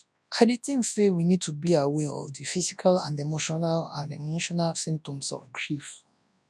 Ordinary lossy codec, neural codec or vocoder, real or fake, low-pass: none; codec, 24 kHz, 0.9 kbps, WavTokenizer, large speech release; fake; none